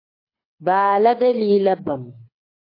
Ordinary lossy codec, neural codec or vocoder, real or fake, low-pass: AAC, 32 kbps; codec, 24 kHz, 6 kbps, HILCodec; fake; 5.4 kHz